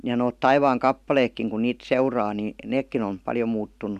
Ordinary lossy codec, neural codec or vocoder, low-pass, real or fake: none; none; 14.4 kHz; real